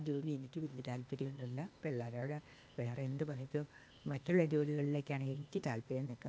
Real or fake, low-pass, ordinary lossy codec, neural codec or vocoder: fake; none; none; codec, 16 kHz, 0.8 kbps, ZipCodec